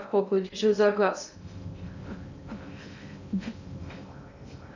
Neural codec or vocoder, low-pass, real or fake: codec, 16 kHz in and 24 kHz out, 0.6 kbps, FocalCodec, streaming, 2048 codes; 7.2 kHz; fake